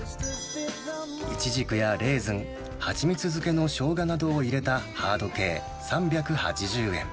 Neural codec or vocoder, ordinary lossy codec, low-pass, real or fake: none; none; none; real